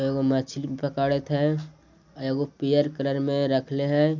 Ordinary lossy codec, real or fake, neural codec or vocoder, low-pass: none; real; none; 7.2 kHz